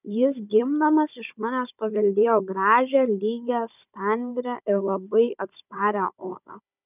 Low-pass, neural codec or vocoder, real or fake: 3.6 kHz; codec, 16 kHz, 16 kbps, FunCodec, trained on Chinese and English, 50 frames a second; fake